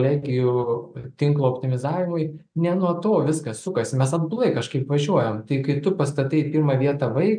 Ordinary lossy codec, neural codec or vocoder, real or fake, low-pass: MP3, 96 kbps; none; real; 9.9 kHz